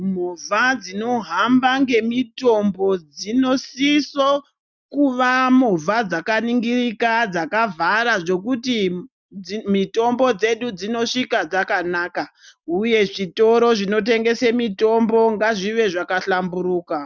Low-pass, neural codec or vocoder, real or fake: 7.2 kHz; none; real